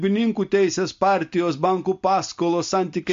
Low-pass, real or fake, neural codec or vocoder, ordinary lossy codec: 7.2 kHz; real; none; MP3, 48 kbps